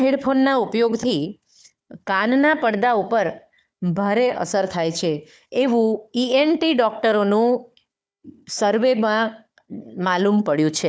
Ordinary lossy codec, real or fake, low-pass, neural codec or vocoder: none; fake; none; codec, 16 kHz, 4 kbps, FunCodec, trained on Chinese and English, 50 frames a second